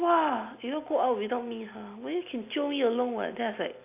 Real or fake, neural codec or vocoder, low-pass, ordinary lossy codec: real; none; 3.6 kHz; AAC, 32 kbps